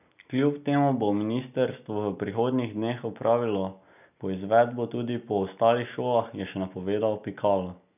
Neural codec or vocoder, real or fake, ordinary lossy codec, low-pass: none; real; none; 3.6 kHz